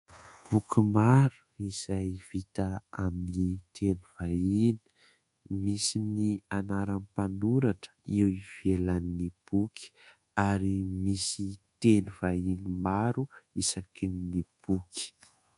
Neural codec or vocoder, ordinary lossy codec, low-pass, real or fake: codec, 24 kHz, 1.2 kbps, DualCodec; MP3, 64 kbps; 10.8 kHz; fake